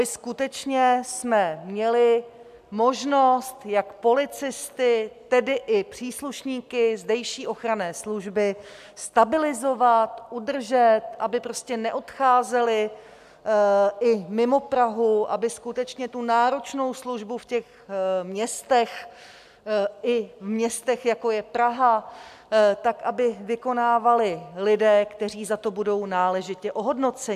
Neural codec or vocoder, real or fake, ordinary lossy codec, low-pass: none; real; AAC, 96 kbps; 14.4 kHz